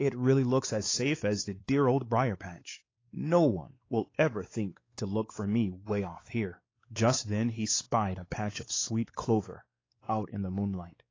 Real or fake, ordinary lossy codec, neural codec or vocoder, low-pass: fake; AAC, 32 kbps; codec, 16 kHz, 4 kbps, X-Codec, WavLM features, trained on Multilingual LibriSpeech; 7.2 kHz